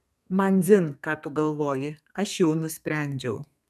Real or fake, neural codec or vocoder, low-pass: fake; codec, 32 kHz, 1.9 kbps, SNAC; 14.4 kHz